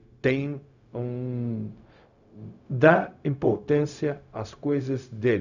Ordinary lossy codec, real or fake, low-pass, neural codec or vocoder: none; fake; 7.2 kHz; codec, 16 kHz, 0.4 kbps, LongCat-Audio-Codec